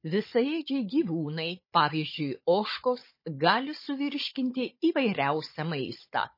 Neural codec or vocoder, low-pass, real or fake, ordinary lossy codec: codec, 16 kHz, 16 kbps, FunCodec, trained on Chinese and English, 50 frames a second; 5.4 kHz; fake; MP3, 24 kbps